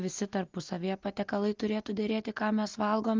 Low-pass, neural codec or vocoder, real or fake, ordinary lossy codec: 7.2 kHz; none; real; Opus, 32 kbps